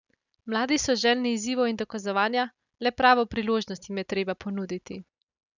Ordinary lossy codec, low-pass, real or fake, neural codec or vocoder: none; 7.2 kHz; real; none